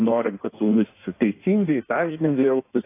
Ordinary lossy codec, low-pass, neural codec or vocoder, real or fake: AAC, 24 kbps; 3.6 kHz; codec, 16 kHz in and 24 kHz out, 1.1 kbps, FireRedTTS-2 codec; fake